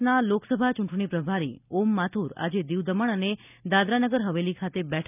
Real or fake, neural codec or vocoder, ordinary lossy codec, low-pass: real; none; none; 3.6 kHz